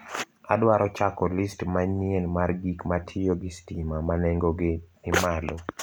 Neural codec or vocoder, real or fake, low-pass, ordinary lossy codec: none; real; none; none